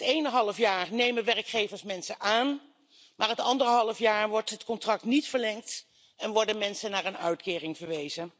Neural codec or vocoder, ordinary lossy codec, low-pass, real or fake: none; none; none; real